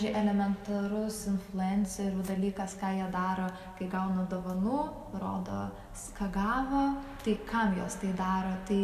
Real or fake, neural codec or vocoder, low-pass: real; none; 14.4 kHz